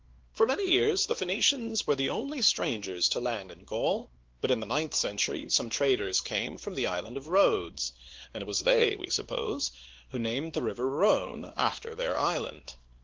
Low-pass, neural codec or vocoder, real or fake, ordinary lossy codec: 7.2 kHz; codec, 16 kHz, 2 kbps, X-Codec, WavLM features, trained on Multilingual LibriSpeech; fake; Opus, 16 kbps